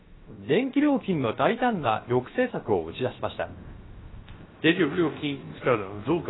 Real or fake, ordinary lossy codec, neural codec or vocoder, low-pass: fake; AAC, 16 kbps; codec, 16 kHz, about 1 kbps, DyCAST, with the encoder's durations; 7.2 kHz